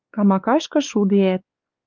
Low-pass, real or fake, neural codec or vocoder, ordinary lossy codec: 7.2 kHz; fake; codec, 16 kHz, 4 kbps, X-Codec, WavLM features, trained on Multilingual LibriSpeech; Opus, 24 kbps